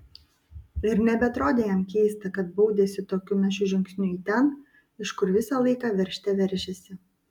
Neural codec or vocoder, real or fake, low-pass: none; real; 19.8 kHz